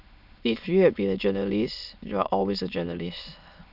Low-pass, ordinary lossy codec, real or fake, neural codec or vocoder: 5.4 kHz; none; fake; autoencoder, 22.05 kHz, a latent of 192 numbers a frame, VITS, trained on many speakers